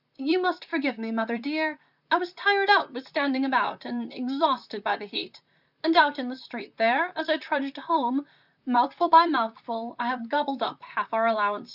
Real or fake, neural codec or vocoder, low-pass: fake; vocoder, 44.1 kHz, 128 mel bands, Pupu-Vocoder; 5.4 kHz